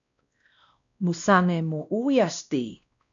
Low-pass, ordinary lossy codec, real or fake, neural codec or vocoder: 7.2 kHz; MP3, 64 kbps; fake; codec, 16 kHz, 1 kbps, X-Codec, WavLM features, trained on Multilingual LibriSpeech